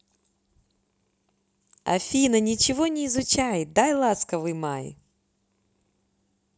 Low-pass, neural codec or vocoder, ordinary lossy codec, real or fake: none; none; none; real